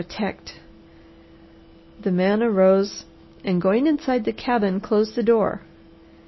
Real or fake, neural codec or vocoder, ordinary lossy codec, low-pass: real; none; MP3, 24 kbps; 7.2 kHz